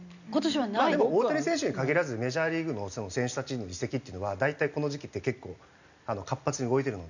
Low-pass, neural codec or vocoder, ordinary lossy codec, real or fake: 7.2 kHz; none; none; real